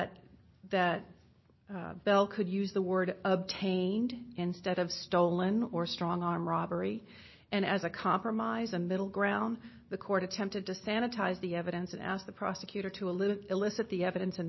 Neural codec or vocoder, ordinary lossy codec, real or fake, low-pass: none; MP3, 24 kbps; real; 7.2 kHz